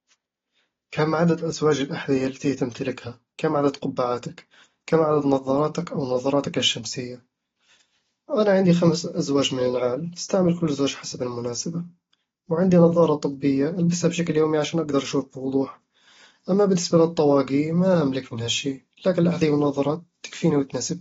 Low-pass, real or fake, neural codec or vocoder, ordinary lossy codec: 7.2 kHz; real; none; AAC, 24 kbps